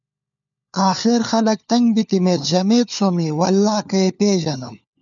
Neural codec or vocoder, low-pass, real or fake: codec, 16 kHz, 4 kbps, FunCodec, trained on LibriTTS, 50 frames a second; 7.2 kHz; fake